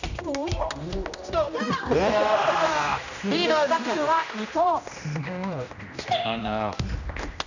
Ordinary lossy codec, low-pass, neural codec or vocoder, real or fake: none; 7.2 kHz; codec, 16 kHz, 1 kbps, X-Codec, HuBERT features, trained on general audio; fake